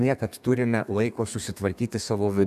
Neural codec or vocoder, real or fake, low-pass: codec, 32 kHz, 1.9 kbps, SNAC; fake; 14.4 kHz